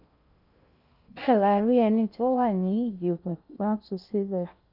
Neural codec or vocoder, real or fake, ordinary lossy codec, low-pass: codec, 16 kHz in and 24 kHz out, 0.6 kbps, FocalCodec, streaming, 2048 codes; fake; none; 5.4 kHz